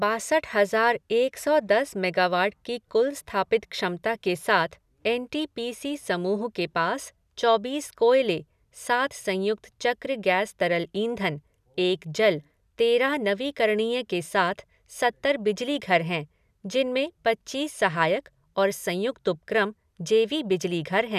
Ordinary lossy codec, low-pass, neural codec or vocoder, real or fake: none; 14.4 kHz; none; real